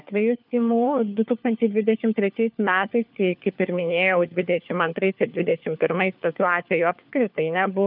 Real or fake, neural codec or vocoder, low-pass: fake; codec, 16 kHz, 4 kbps, FunCodec, trained on LibriTTS, 50 frames a second; 5.4 kHz